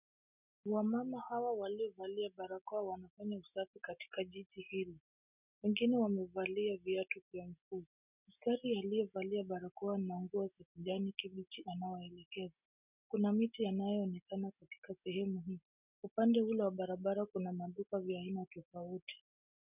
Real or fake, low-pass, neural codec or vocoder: real; 3.6 kHz; none